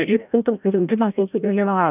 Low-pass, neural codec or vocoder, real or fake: 3.6 kHz; codec, 16 kHz, 0.5 kbps, FreqCodec, larger model; fake